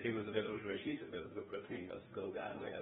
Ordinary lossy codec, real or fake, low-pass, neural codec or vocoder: AAC, 16 kbps; fake; 7.2 kHz; codec, 16 kHz, 1 kbps, FreqCodec, larger model